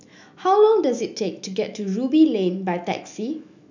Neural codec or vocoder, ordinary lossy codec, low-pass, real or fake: autoencoder, 48 kHz, 128 numbers a frame, DAC-VAE, trained on Japanese speech; none; 7.2 kHz; fake